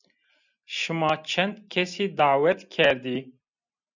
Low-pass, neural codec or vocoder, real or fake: 7.2 kHz; none; real